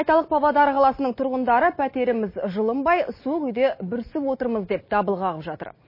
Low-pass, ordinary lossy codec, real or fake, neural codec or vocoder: 5.4 kHz; MP3, 24 kbps; real; none